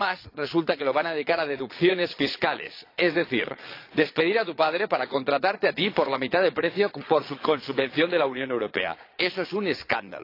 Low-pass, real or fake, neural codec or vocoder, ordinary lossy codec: 5.4 kHz; fake; vocoder, 22.05 kHz, 80 mel bands, Vocos; AAC, 32 kbps